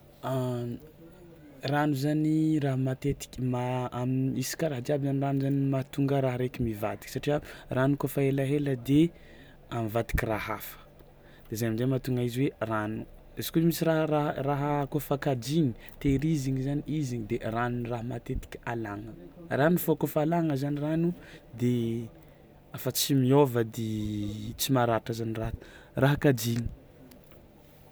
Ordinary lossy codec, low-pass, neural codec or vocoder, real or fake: none; none; none; real